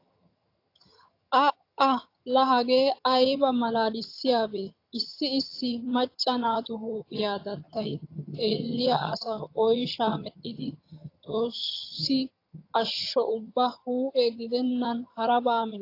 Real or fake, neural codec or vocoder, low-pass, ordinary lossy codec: fake; vocoder, 22.05 kHz, 80 mel bands, HiFi-GAN; 5.4 kHz; AAC, 32 kbps